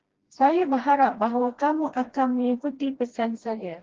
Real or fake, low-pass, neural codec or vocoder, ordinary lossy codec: fake; 7.2 kHz; codec, 16 kHz, 1 kbps, FreqCodec, smaller model; Opus, 16 kbps